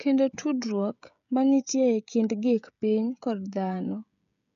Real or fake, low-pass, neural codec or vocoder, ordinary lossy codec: fake; 7.2 kHz; codec, 16 kHz, 16 kbps, FreqCodec, smaller model; none